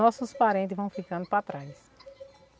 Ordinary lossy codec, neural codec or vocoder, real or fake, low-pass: none; none; real; none